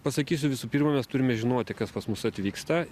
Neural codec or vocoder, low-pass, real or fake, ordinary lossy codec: none; 14.4 kHz; real; Opus, 64 kbps